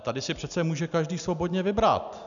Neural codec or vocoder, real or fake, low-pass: none; real; 7.2 kHz